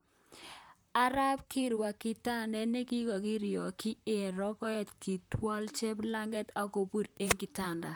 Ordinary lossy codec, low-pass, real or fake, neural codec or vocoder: none; none; fake; vocoder, 44.1 kHz, 128 mel bands, Pupu-Vocoder